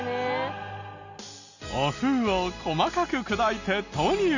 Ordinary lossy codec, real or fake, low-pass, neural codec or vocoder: none; real; 7.2 kHz; none